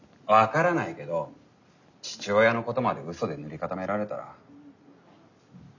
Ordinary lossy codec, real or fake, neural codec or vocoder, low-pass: none; real; none; 7.2 kHz